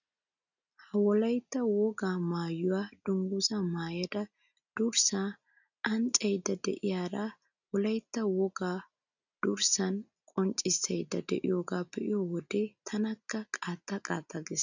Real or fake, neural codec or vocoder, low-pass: real; none; 7.2 kHz